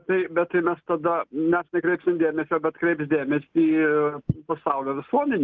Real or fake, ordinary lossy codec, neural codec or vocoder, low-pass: real; Opus, 32 kbps; none; 7.2 kHz